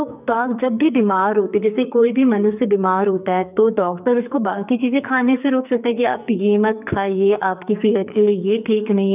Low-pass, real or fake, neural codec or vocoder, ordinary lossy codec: 3.6 kHz; fake; codec, 44.1 kHz, 2.6 kbps, SNAC; none